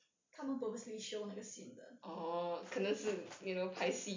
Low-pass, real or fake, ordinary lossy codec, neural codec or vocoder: 7.2 kHz; real; MP3, 64 kbps; none